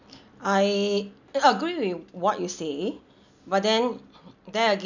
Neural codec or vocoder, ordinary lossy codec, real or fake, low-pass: none; none; real; 7.2 kHz